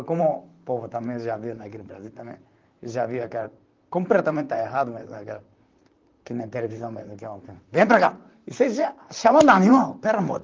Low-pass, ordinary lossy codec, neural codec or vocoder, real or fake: 7.2 kHz; Opus, 32 kbps; vocoder, 44.1 kHz, 128 mel bands, Pupu-Vocoder; fake